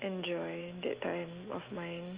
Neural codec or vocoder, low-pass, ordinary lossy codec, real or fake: autoencoder, 48 kHz, 128 numbers a frame, DAC-VAE, trained on Japanese speech; 3.6 kHz; Opus, 32 kbps; fake